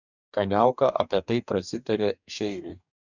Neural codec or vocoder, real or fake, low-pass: codec, 44.1 kHz, 2.6 kbps, DAC; fake; 7.2 kHz